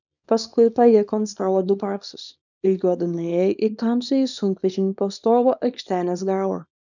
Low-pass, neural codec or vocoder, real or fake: 7.2 kHz; codec, 24 kHz, 0.9 kbps, WavTokenizer, small release; fake